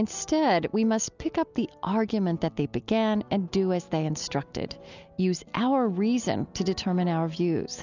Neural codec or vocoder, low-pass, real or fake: none; 7.2 kHz; real